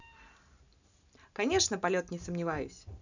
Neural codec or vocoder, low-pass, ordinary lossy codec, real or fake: none; 7.2 kHz; none; real